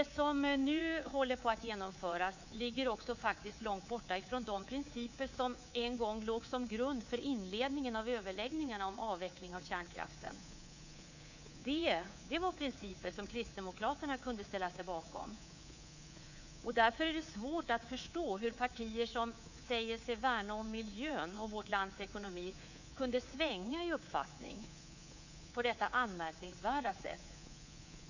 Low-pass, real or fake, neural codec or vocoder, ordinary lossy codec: 7.2 kHz; fake; codec, 24 kHz, 3.1 kbps, DualCodec; none